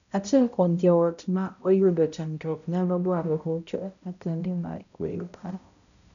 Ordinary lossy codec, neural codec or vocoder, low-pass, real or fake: none; codec, 16 kHz, 0.5 kbps, X-Codec, HuBERT features, trained on balanced general audio; 7.2 kHz; fake